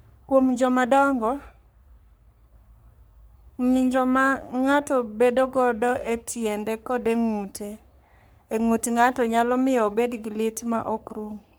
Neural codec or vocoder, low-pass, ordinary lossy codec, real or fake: codec, 44.1 kHz, 3.4 kbps, Pupu-Codec; none; none; fake